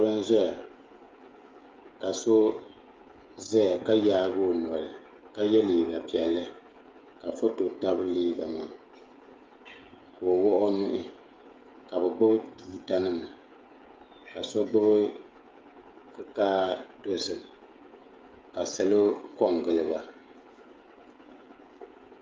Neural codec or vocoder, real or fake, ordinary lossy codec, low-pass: codec, 16 kHz, 16 kbps, FreqCodec, smaller model; fake; Opus, 32 kbps; 7.2 kHz